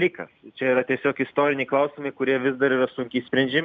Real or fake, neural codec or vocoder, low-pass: real; none; 7.2 kHz